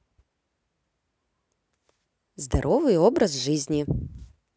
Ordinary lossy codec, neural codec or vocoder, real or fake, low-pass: none; none; real; none